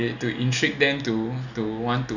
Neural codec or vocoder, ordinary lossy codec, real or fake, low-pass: none; none; real; 7.2 kHz